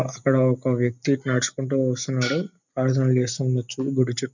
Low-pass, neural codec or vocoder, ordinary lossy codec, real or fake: 7.2 kHz; none; none; real